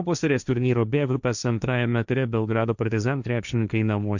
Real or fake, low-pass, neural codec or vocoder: fake; 7.2 kHz; codec, 16 kHz, 1.1 kbps, Voila-Tokenizer